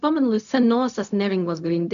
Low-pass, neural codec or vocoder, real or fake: 7.2 kHz; codec, 16 kHz, 0.4 kbps, LongCat-Audio-Codec; fake